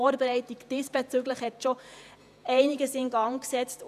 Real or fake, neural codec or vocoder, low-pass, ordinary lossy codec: fake; vocoder, 48 kHz, 128 mel bands, Vocos; 14.4 kHz; none